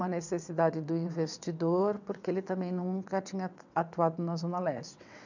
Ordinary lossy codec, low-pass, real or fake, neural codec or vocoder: none; 7.2 kHz; fake; vocoder, 22.05 kHz, 80 mel bands, WaveNeXt